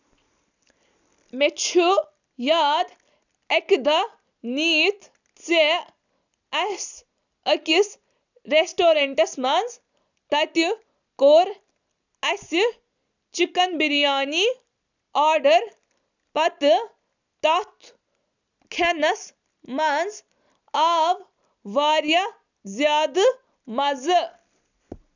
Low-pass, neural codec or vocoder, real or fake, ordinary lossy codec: 7.2 kHz; none; real; none